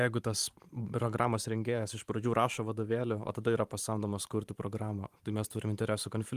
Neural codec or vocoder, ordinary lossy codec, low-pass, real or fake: vocoder, 44.1 kHz, 128 mel bands every 512 samples, BigVGAN v2; Opus, 32 kbps; 14.4 kHz; fake